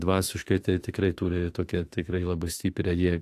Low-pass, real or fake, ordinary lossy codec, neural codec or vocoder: 14.4 kHz; fake; AAC, 64 kbps; autoencoder, 48 kHz, 32 numbers a frame, DAC-VAE, trained on Japanese speech